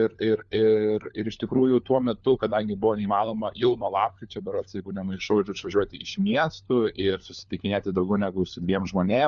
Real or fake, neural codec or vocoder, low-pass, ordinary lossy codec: fake; codec, 16 kHz, 4 kbps, FunCodec, trained on LibriTTS, 50 frames a second; 7.2 kHz; Opus, 64 kbps